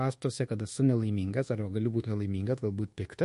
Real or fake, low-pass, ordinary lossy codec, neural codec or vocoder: fake; 10.8 kHz; MP3, 48 kbps; codec, 24 kHz, 1.2 kbps, DualCodec